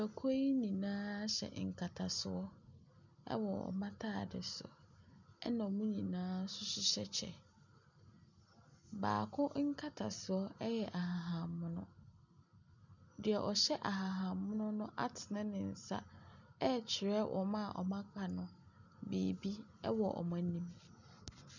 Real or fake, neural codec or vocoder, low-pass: real; none; 7.2 kHz